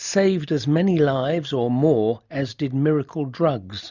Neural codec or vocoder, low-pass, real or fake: none; 7.2 kHz; real